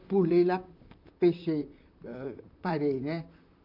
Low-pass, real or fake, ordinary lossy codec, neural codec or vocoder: 5.4 kHz; fake; AAC, 48 kbps; vocoder, 44.1 kHz, 128 mel bands, Pupu-Vocoder